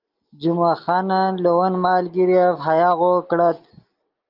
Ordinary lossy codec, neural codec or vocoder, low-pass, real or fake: Opus, 24 kbps; none; 5.4 kHz; real